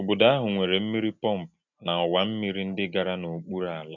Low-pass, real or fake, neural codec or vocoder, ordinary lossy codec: 7.2 kHz; real; none; none